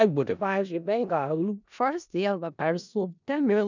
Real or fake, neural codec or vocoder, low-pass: fake; codec, 16 kHz in and 24 kHz out, 0.4 kbps, LongCat-Audio-Codec, four codebook decoder; 7.2 kHz